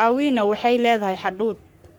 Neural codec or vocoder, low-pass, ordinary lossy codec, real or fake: codec, 44.1 kHz, 3.4 kbps, Pupu-Codec; none; none; fake